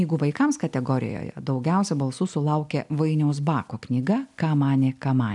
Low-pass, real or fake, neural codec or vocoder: 10.8 kHz; real; none